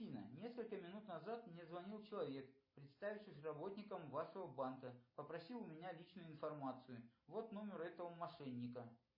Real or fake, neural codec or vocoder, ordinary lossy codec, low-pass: real; none; MP3, 24 kbps; 5.4 kHz